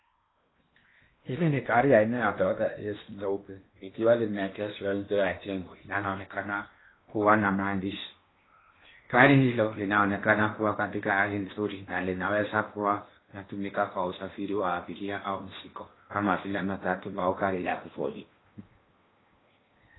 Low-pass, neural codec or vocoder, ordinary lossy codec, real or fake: 7.2 kHz; codec, 16 kHz in and 24 kHz out, 0.8 kbps, FocalCodec, streaming, 65536 codes; AAC, 16 kbps; fake